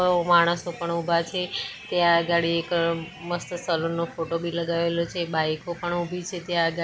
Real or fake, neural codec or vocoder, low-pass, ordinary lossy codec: real; none; none; none